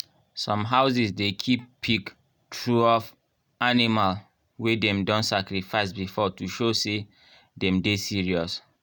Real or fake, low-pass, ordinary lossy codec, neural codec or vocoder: real; none; none; none